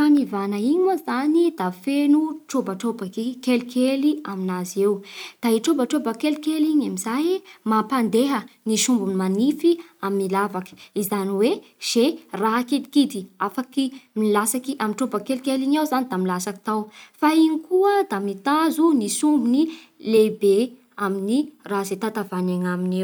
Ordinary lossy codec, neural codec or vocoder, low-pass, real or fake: none; none; none; real